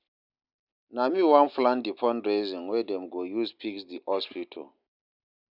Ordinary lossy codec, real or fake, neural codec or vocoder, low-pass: none; real; none; 5.4 kHz